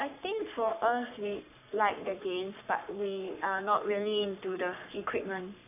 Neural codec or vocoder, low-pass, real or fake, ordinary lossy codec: codec, 44.1 kHz, 3.4 kbps, Pupu-Codec; 3.6 kHz; fake; none